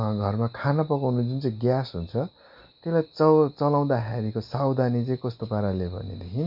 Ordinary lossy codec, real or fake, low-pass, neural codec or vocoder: MP3, 48 kbps; real; 5.4 kHz; none